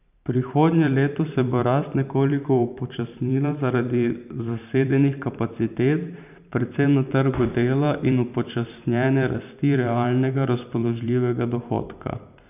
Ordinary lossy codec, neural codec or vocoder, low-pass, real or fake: none; vocoder, 44.1 kHz, 128 mel bands every 512 samples, BigVGAN v2; 3.6 kHz; fake